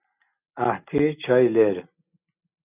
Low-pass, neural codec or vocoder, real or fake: 3.6 kHz; none; real